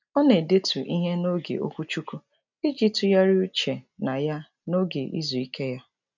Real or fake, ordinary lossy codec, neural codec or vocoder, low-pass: real; none; none; 7.2 kHz